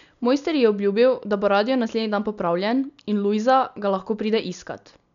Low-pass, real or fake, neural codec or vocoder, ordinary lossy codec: 7.2 kHz; real; none; none